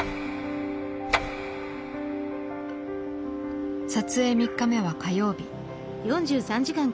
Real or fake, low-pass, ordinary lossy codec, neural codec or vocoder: real; none; none; none